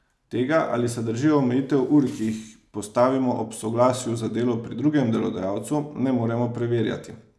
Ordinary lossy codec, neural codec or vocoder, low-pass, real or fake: none; none; none; real